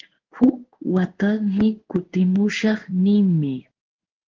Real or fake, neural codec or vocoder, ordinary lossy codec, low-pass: fake; codec, 16 kHz in and 24 kHz out, 1 kbps, XY-Tokenizer; Opus, 16 kbps; 7.2 kHz